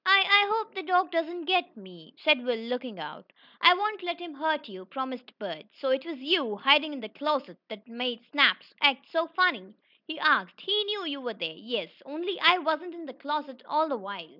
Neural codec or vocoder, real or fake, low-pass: none; real; 5.4 kHz